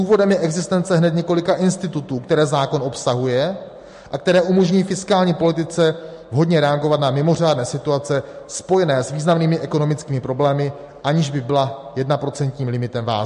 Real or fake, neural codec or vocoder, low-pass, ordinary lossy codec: real; none; 14.4 kHz; MP3, 48 kbps